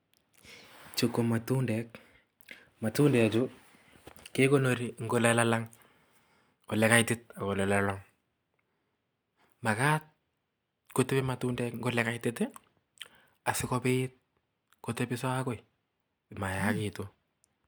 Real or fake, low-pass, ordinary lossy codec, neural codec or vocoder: real; none; none; none